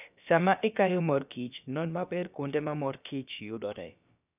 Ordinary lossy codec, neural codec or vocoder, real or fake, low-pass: none; codec, 16 kHz, about 1 kbps, DyCAST, with the encoder's durations; fake; 3.6 kHz